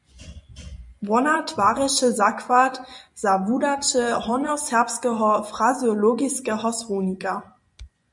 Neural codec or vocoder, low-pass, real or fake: vocoder, 24 kHz, 100 mel bands, Vocos; 10.8 kHz; fake